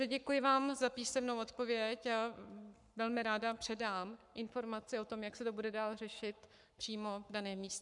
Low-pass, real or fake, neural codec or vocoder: 10.8 kHz; fake; codec, 44.1 kHz, 7.8 kbps, Pupu-Codec